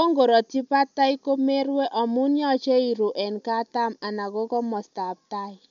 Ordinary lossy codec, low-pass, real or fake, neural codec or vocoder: none; 7.2 kHz; real; none